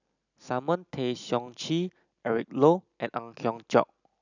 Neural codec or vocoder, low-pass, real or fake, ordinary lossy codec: none; 7.2 kHz; real; none